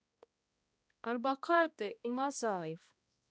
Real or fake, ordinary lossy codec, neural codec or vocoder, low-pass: fake; none; codec, 16 kHz, 1 kbps, X-Codec, HuBERT features, trained on balanced general audio; none